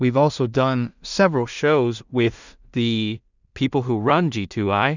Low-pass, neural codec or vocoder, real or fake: 7.2 kHz; codec, 16 kHz in and 24 kHz out, 0.4 kbps, LongCat-Audio-Codec, two codebook decoder; fake